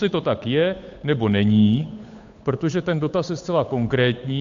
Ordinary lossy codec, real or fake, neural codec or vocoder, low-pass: AAC, 64 kbps; fake; codec, 16 kHz, 8 kbps, FunCodec, trained on Chinese and English, 25 frames a second; 7.2 kHz